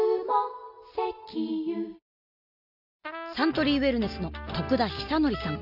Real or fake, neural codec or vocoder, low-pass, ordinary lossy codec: real; none; 5.4 kHz; none